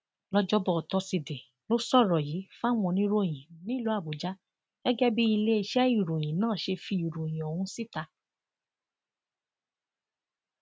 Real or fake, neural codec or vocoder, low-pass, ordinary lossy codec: real; none; none; none